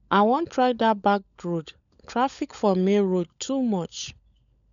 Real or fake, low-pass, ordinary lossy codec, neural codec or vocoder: fake; 7.2 kHz; MP3, 96 kbps; codec, 16 kHz, 16 kbps, FunCodec, trained on LibriTTS, 50 frames a second